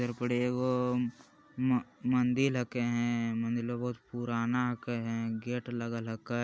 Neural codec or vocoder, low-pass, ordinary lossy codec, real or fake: none; none; none; real